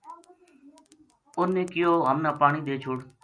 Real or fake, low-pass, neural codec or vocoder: real; 9.9 kHz; none